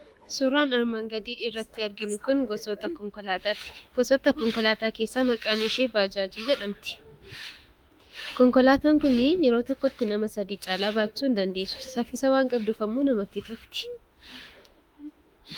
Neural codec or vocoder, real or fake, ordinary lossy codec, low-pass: autoencoder, 48 kHz, 32 numbers a frame, DAC-VAE, trained on Japanese speech; fake; Opus, 32 kbps; 19.8 kHz